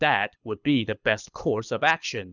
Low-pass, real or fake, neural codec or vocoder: 7.2 kHz; fake; codec, 24 kHz, 6 kbps, HILCodec